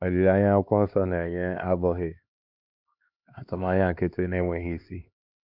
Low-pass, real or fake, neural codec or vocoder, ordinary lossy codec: 5.4 kHz; fake; codec, 16 kHz, 2 kbps, X-Codec, HuBERT features, trained on LibriSpeech; none